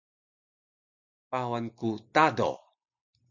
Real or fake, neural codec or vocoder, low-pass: real; none; 7.2 kHz